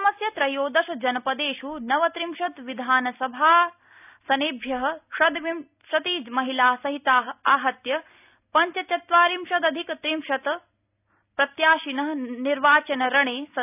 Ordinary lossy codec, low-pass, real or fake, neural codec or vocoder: none; 3.6 kHz; real; none